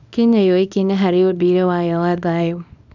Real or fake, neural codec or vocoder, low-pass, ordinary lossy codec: fake; codec, 24 kHz, 0.9 kbps, WavTokenizer, small release; 7.2 kHz; none